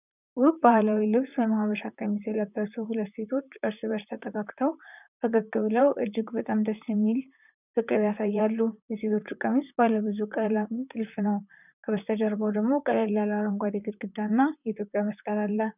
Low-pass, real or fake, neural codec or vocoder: 3.6 kHz; fake; vocoder, 44.1 kHz, 128 mel bands, Pupu-Vocoder